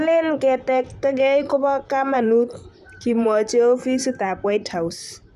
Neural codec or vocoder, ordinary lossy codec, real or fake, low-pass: vocoder, 44.1 kHz, 128 mel bands, Pupu-Vocoder; none; fake; 14.4 kHz